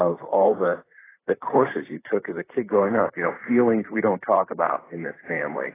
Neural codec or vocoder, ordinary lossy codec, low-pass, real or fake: vocoder, 44.1 kHz, 128 mel bands, Pupu-Vocoder; AAC, 16 kbps; 3.6 kHz; fake